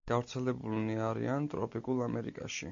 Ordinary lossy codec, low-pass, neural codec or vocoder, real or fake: MP3, 48 kbps; 7.2 kHz; none; real